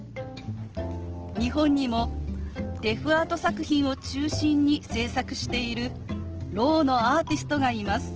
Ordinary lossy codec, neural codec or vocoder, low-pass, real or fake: Opus, 16 kbps; none; 7.2 kHz; real